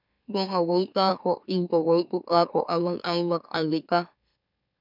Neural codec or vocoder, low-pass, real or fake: autoencoder, 44.1 kHz, a latent of 192 numbers a frame, MeloTTS; 5.4 kHz; fake